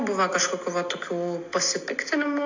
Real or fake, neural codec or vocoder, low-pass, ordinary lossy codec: real; none; 7.2 kHz; AAC, 48 kbps